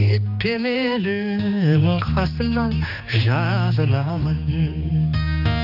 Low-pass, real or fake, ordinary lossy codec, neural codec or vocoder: 5.4 kHz; fake; none; codec, 16 kHz, 2 kbps, X-Codec, HuBERT features, trained on general audio